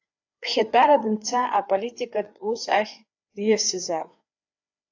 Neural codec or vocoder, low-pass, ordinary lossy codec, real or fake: codec, 16 kHz, 4 kbps, FreqCodec, larger model; 7.2 kHz; AAC, 48 kbps; fake